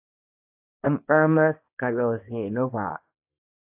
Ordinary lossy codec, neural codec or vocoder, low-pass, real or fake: AAC, 32 kbps; codec, 24 kHz, 0.9 kbps, WavTokenizer, small release; 3.6 kHz; fake